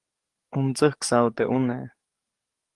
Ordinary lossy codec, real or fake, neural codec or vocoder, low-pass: Opus, 24 kbps; fake; codec, 44.1 kHz, 7.8 kbps, DAC; 10.8 kHz